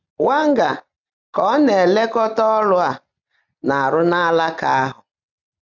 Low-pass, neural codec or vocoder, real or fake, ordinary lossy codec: 7.2 kHz; none; real; none